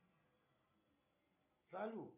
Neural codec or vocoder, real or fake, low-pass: none; real; 3.6 kHz